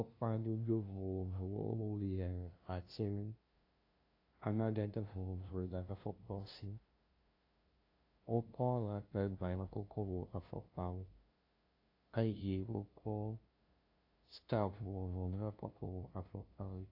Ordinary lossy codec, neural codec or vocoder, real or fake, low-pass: AAC, 32 kbps; codec, 16 kHz, 0.5 kbps, FunCodec, trained on LibriTTS, 25 frames a second; fake; 5.4 kHz